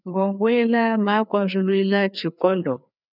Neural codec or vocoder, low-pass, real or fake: codec, 16 kHz, 2 kbps, FreqCodec, larger model; 5.4 kHz; fake